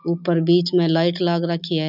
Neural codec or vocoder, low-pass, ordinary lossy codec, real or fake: none; 5.4 kHz; none; real